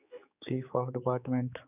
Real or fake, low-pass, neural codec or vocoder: fake; 3.6 kHz; codec, 16 kHz, 4 kbps, X-Codec, HuBERT features, trained on general audio